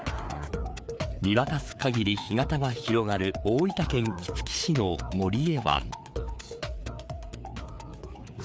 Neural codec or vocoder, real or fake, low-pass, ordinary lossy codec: codec, 16 kHz, 4 kbps, FreqCodec, larger model; fake; none; none